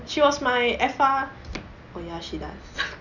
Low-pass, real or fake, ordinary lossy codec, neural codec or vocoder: 7.2 kHz; real; none; none